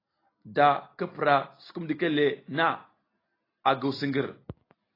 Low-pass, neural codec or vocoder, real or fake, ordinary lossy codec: 5.4 kHz; none; real; AAC, 24 kbps